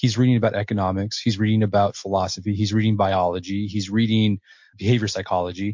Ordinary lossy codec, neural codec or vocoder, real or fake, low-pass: MP3, 48 kbps; none; real; 7.2 kHz